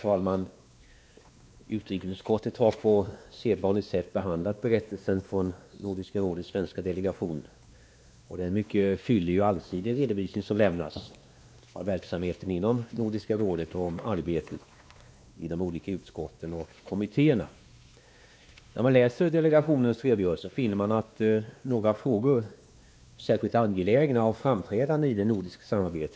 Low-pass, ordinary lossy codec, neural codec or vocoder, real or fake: none; none; codec, 16 kHz, 2 kbps, X-Codec, WavLM features, trained on Multilingual LibriSpeech; fake